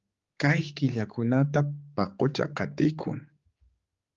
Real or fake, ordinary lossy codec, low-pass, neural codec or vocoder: fake; Opus, 32 kbps; 7.2 kHz; codec, 16 kHz, 4 kbps, X-Codec, HuBERT features, trained on general audio